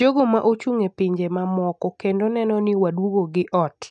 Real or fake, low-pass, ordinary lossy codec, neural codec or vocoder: real; 9.9 kHz; none; none